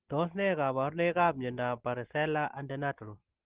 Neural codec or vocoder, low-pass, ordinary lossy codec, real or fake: none; 3.6 kHz; Opus, 16 kbps; real